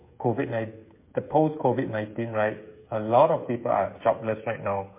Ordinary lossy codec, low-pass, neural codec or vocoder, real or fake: MP3, 24 kbps; 3.6 kHz; codec, 16 kHz, 8 kbps, FreqCodec, smaller model; fake